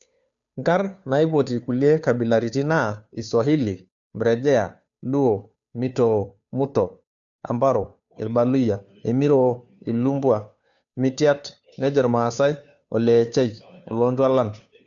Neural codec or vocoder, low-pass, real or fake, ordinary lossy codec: codec, 16 kHz, 2 kbps, FunCodec, trained on Chinese and English, 25 frames a second; 7.2 kHz; fake; none